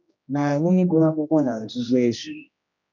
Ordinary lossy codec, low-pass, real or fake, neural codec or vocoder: none; 7.2 kHz; fake; codec, 16 kHz, 1 kbps, X-Codec, HuBERT features, trained on general audio